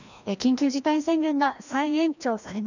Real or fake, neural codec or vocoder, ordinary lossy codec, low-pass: fake; codec, 16 kHz, 1 kbps, FreqCodec, larger model; none; 7.2 kHz